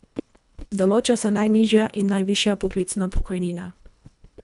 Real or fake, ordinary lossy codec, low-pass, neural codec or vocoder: fake; none; 10.8 kHz; codec, 24 kHz, 1.5 kbps, HILCodec